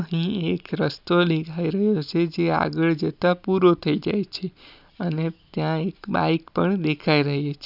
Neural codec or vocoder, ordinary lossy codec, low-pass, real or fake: none; none; 5.4 kHz; real